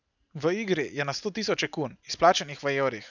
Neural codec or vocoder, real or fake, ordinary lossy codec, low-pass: none; real; none; 7.2 kHz